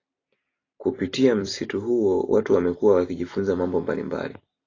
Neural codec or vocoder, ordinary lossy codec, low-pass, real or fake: vocoder, 44.1 kHz, 128 mel bands every 256 samples, BigVGAN v2; AAC, 32 kbps; 7.2 kHz; fake